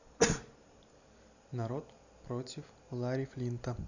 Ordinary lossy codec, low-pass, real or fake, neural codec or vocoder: none; 7.2 kHz; real; none